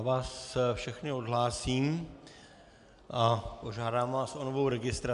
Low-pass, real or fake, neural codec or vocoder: 10.8 kHz; real; none